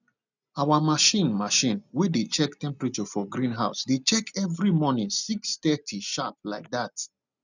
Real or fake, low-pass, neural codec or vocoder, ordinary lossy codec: real; 7.2 kHz; none; none